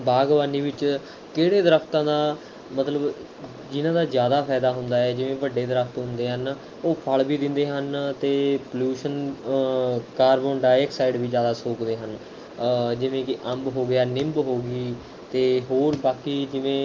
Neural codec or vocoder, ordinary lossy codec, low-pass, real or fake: none; Opus, 32 kbps; 7.2 kHz; real